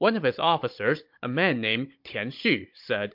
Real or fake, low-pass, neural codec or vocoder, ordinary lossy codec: fake; 5.4 kHz; codec, 16 kHz, 16 kbps, FunCodec, trained on Chinese and English, 50 frames a second; MP3, 48 kbps